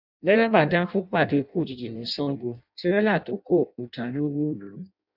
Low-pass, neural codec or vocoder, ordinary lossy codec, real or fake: 5.4 kHz; codec, 16 kHz in and 24 kHz out, 0.6 kbps, FireRedTTS-2 codec; none; fake